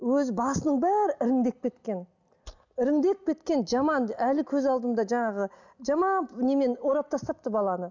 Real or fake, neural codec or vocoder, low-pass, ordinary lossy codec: real; none; 7.2 kHz; none